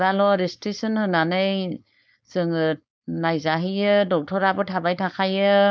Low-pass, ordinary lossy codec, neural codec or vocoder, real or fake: none; none; codec, 16 kHz, 4.8 kbps, FACodec; fake